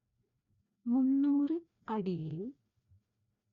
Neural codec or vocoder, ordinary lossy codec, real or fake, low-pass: codec, 16 kHz, 1 kbps, FreqCodec, larger model; Opus, 64 kbps; fake; 7.2 kHz